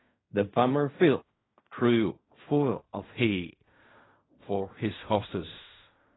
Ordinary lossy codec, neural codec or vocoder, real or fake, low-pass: AAC, 16 kbps; codec, 16 kHz in and 24 kHz out, 0.4 kbps, LongCat-Audio-Codec, fine tuned four codebook decoder; fake; 7.2 kHz